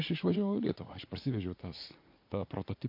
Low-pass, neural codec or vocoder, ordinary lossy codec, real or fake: 5.4 kHz; vocoder, 44.1 kHz, 80 mel bands, Vocos; MP3, 32 kbps; fake